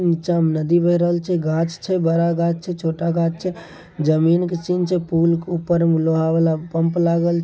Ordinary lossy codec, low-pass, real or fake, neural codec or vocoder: none; none; real; none